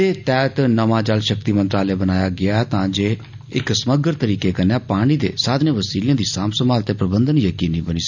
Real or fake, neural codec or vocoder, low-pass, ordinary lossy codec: real; none; 7.2 kHz; none